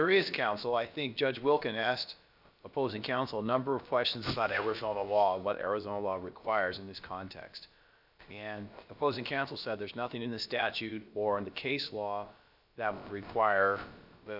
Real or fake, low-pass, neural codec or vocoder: fake; 5.4 kHz; codec, 16 kHz, about 1 kbps, DyCAST, with the encoder's durations